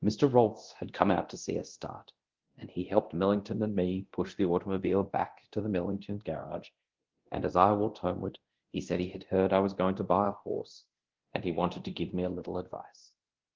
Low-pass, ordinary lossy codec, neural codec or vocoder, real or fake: 7.2 kHz; Opus, 16 kbps; codec, 24 kHz, 0.9 kbps, DualCodec; fake